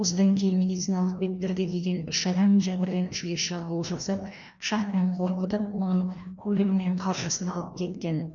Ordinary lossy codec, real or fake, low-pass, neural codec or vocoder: none; fake; 7.2 kHz; codec, 16 kHz, 1 kbps, FreqCodec, larger model